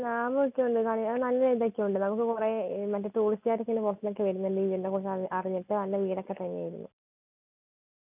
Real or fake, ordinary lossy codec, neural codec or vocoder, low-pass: real; none; none; 3.6 kHz